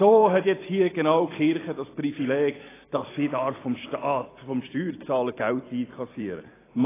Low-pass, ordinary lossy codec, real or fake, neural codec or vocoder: 3.6 kHz; AAC, 16 kbps; fake; vocoder, 44.1 kHz, 128 mel bands every 256 samples, BigVGAN v2